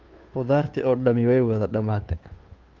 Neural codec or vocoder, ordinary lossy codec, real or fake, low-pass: codec, 16 kHz, 2 kbps, FunCodec, trained on Chinese and English, 25 frames a second; Opus, 32 kbps; fake; 7.2 kHz